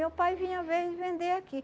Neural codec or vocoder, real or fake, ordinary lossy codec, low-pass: none; real; none; none